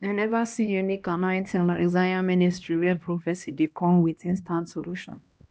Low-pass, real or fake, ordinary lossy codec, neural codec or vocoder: none; fake; none; codec, 16 kHz, 1 kbps, X-Codec, HuBERT features, trained on LibriSpeech